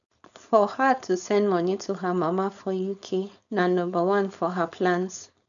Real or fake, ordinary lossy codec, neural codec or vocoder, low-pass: fake; none; codec, 16 kHz, 4.8 kbps, FACodec; 7.2 kHz